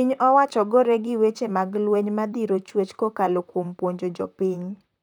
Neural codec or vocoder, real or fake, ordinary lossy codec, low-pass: vocoder, 44.1 kHz, 128 mel bands, Pupu-Vocoder; fake; none; 19.8 kHz